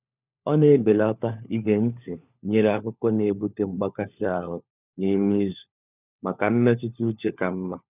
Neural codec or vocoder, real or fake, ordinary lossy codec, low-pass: codec, 16 kHz, 4 kbps, FunCodec, trained on LibriTTS, 50 frames a second; fake; none; 3.6 kHz